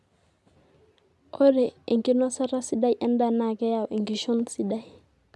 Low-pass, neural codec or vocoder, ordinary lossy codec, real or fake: none; none; none; real